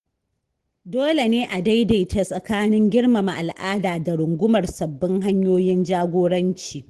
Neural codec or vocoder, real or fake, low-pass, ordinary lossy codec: none; real; 10.8 kHz; Opus, 16 kbps